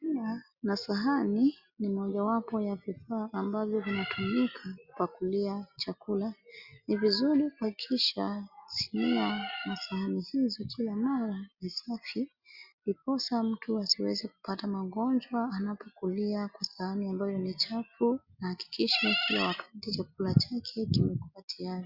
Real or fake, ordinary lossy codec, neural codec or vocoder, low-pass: real; Opus, 64 kbps; none; 5.4 kHz